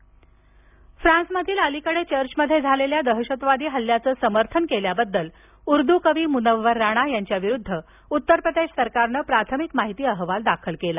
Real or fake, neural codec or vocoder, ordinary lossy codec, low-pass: real; none; none; 3.6 kHz